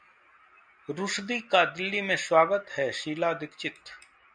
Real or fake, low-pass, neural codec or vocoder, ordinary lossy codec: real; 9.9 kHz; none; MP3, 96 kbps